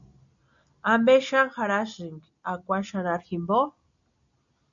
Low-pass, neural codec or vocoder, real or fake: 7.2 kHz; none; real